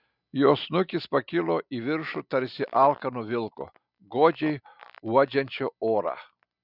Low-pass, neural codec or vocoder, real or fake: 5.4 kHz; none; real